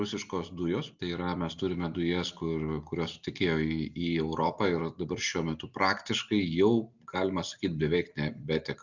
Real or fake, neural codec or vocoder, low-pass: real; none; 7.2 kHz